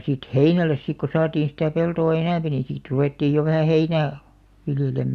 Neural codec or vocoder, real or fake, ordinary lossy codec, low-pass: none; real; Opus, 64 kbps; 14.4 kHz